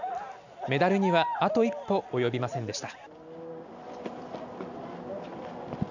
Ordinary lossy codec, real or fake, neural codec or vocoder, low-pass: none; real; none; 7.2 kHz